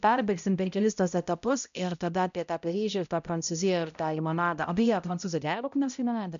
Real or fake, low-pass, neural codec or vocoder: fake; 7.2 kHz; codec, 16 kHz, 0.5 kbps, X-Codec, HuBERT features, trained on balanced general audio